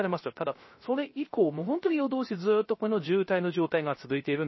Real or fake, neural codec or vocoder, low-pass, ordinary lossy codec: fake; codec, 16 kHz, 0.3 kbps, FocalCodec; 7.2 kHz; MP3, 24 kbps